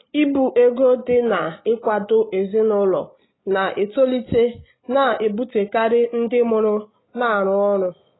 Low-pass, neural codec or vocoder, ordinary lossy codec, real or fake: 7.2 kHz; none; AAC, 16 kbps; real